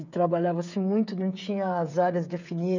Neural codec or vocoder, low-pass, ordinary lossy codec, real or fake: codec, 16 kHz, 8 kbps, FreqCodec, smaller model; 7.2 kHz; none; fake